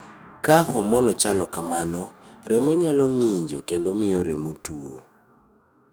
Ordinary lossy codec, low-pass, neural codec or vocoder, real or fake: none; none; codec, 44.1 kHz, 2.6 kbps, DAC; fake